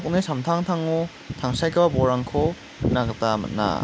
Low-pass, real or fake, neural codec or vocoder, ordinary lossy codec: none; real; none; none